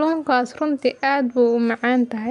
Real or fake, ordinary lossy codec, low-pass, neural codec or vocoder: real; MP3, 96 kbps; 10.8 kHz; none